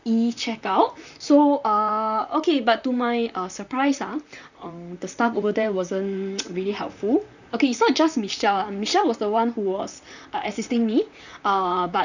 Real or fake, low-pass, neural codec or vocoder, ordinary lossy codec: fake; 7.2 kHz; vocoder, 44.1 kHz, 128 mel bands, Pupu-Vocoder; none